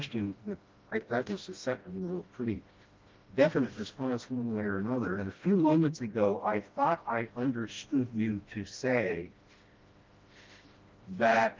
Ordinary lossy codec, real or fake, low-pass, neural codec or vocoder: Opus, 32 kbps; fake; 7.2 kHz; codec, 16 kHz, 1 kbps, FreqCodec, smaller model